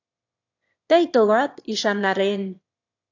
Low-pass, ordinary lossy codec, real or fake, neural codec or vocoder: 7.2 kHz; AAC, 48 kbps; fake; autoencoder, 22.05 kHz, a latent of 192 numbers a frame, VITS, trained on one speaker